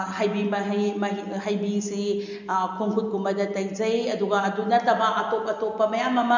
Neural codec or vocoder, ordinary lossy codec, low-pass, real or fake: none; none; 7.2 kHz; real